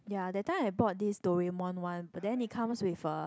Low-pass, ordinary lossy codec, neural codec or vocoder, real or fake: none; none; none; real